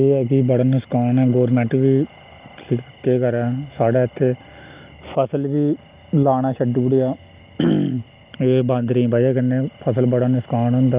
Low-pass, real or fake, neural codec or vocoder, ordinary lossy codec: 3.6 kHz; real; none; Opus, 32 kbps